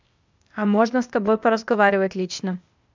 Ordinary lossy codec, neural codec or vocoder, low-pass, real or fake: MP3, 64 kbps; codec, 16 kHz, 0.8 kbps, ZipCodec; 7.2 kHz; fake